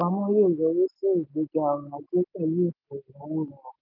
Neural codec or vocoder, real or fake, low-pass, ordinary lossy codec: none; real; 5.4 kHz; none